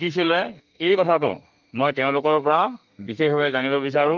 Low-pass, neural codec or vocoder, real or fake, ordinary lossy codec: 7.2 kHz; codec, 32 kHz, 1.9 kbps, SNAC; fake; Opus, 24 kbps